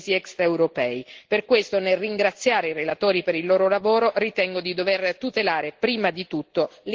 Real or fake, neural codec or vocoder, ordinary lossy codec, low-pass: real; none; Opus, 16 kbps; 7.2 kHz